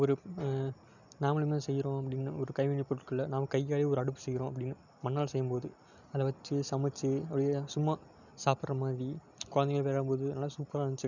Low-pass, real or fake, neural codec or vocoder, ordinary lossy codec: 7.2 kHz; real; none; none